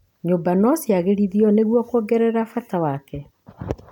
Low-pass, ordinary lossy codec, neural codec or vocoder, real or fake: 19.8 kHz; none; none; real